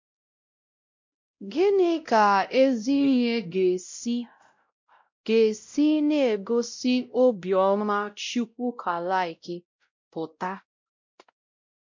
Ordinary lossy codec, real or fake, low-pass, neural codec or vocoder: MP3, 48 kbps; fake; 7.2 kHz; codec, 16 kHz, 0.5 kbps, X-Codec, WavLM features, trained on Multilingual LibriSpeech